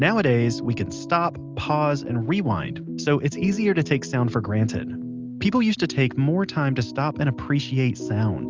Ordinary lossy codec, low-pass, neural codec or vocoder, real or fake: Opus, 24 kbps; 7.2 kHz; none; real